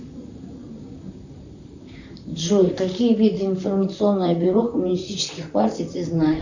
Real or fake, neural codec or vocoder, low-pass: fake; vocoder, 44.1 kHz, 128 mel bands, Pupu-Vocoder; 7.2 kHz